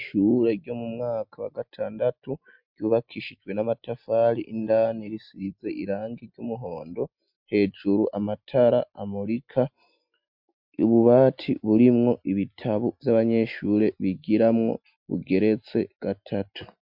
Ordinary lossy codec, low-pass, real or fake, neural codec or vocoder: MP3, 48 kbps; 5.4 kHz; real; none